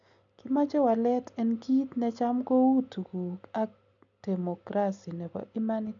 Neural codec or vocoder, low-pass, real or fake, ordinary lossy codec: none; 7.2 kHz; real; none